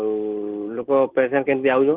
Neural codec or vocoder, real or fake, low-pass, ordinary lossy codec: none; real; 3.6 kHz; Opus, 16 kbps